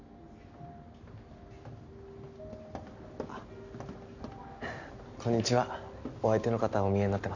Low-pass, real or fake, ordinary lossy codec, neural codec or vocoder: 7.2 kHz; real; none; none